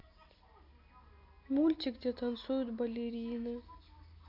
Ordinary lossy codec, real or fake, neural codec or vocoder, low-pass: AAC, 48 kbps; real; none; 5.4 kHz